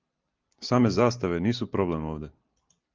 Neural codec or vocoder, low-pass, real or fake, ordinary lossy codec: none; 7.2 kHz; real; Opus, 24 kbps